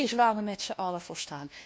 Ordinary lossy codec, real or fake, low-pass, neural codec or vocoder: none; fake; none; codec, 16 kHz, 1 kbps, FunCodec, trained on LibriTTS, 50 frames a second